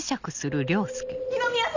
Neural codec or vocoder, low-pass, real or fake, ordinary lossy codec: none; 7.2 kHz; real; Opus, 64 kbps